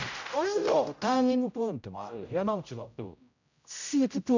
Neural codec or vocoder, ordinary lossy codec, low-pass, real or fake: codec, 16 kHz, 0.5 kbps, X-Codec, HuBERT features, trained on general audio; none; 7.2 kHz; fake